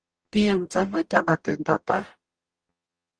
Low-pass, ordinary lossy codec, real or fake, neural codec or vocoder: 9.9 kHz; Opus, 24 kbps; fake; codec, 44.1 kHz, 0.9 kbps, DAC